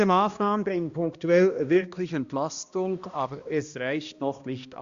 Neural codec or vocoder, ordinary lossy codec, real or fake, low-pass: codec, 16 kHz, 1 kbps, X-Codec, HuBERT features, trained on balanced general audio; Opus, 64 kbps; fake; 7.2 kHz